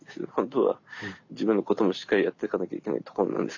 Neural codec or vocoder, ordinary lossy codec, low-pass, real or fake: none; none; 7.2 kHz; real